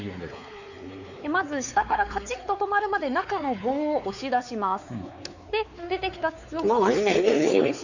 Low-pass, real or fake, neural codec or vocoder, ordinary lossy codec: 7.2 kHz; fake; codec, 16 kHz, 4 kbps, X-Codec, WavLM features, trained on Multilingual LibriSpeech; none